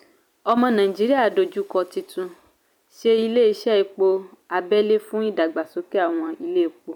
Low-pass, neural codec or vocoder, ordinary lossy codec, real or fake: 19.8 kHz; vocoder, 44.1 kHz, 128 mel bands every 256 samples, BigVGAN v2; none; fake